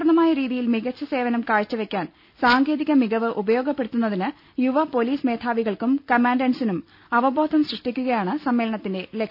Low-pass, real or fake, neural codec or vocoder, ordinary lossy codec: 5.4 kHz; real; none; none